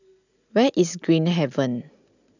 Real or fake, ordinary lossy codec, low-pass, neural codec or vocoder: real; none; 7.2 kHz; none